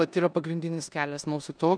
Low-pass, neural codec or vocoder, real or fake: 9.9 kHz; codec, 16 kHz in and 24 kHz out, 0.9 kbps, LongCat-Audio-Codec, four codebook decoder; fake